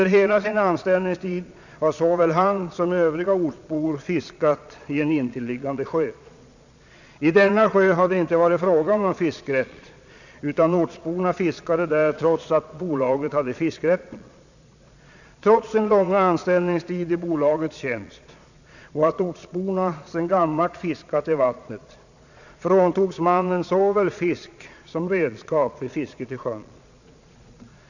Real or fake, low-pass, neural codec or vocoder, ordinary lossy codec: fake; 7.2 kHz; vocoder, 22.05 kHz, 80 mel bands, Vocos; none